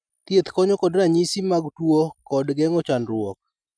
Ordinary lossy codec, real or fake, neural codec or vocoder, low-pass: none; real; none; 9.9 kHz